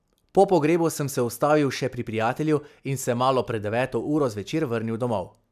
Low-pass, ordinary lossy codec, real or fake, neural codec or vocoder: 14.4 kHz; none; real; none